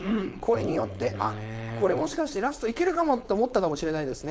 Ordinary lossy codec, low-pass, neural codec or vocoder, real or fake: none; none; codec, 16 kHz, 4.8 kbps, FACodec; fake